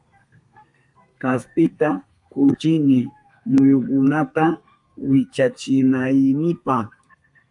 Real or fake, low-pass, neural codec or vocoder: fake; 10.8 kHz; codec, 32 kHz, 1.9 kbps, SNAC